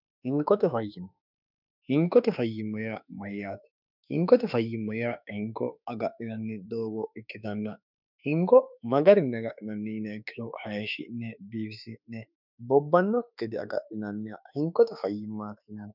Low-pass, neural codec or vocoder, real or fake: 5.4 kHz; autoencoder, 48 kHz, 32 numbers a frame, DAC-VAE, trained on Japanese speech; fake